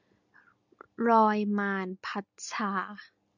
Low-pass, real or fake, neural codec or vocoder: 7.2 kHz; real; none